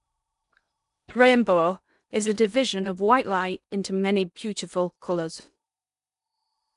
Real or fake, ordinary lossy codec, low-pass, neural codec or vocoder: fake; none; 10.8 kHz; codec, 16 kHz in and 24 kHz out, 0.8 kbps, FocalCodec, streaming, 65536 codes